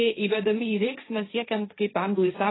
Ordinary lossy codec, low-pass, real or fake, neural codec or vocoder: AAC, 16 kbps; 7.2 kHz; fake; codec, 16 kHz, 1.1 kbps, Voila-Tokenizer